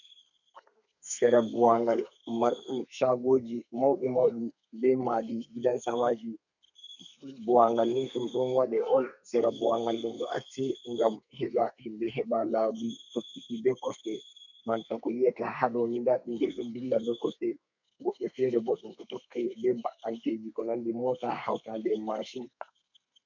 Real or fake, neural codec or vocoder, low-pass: fake; codec, 32 kHz, 1.9 kbps, SNAC; 7.2 kHz